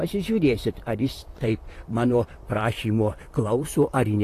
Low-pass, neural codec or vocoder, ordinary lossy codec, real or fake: 14.4 kHz; vocoder, 44.1 kHz, 128 mel bands, Pupu-Vocoder; AAC, 48 kbps; fake